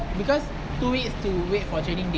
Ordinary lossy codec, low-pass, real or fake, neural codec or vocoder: none; none; real; none